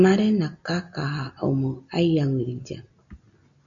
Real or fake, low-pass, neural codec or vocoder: real; 7.2 kHz; none